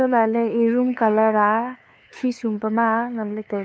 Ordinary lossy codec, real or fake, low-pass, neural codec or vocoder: none; fake; none; codec, 16 kHz, 2 kbps, FunCodec, trained on LibriTTS, 25 frames a second